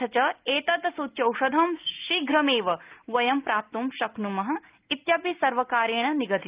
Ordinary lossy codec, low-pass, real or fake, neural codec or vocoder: Opus, 24 kbps; 3.6 kHz; real; none